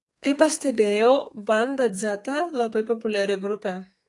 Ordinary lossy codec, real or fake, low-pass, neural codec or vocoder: AAC, 48 kbps; fake; 10.8 kHz; codec, 32 kHz, 1.9 kbps, SNAC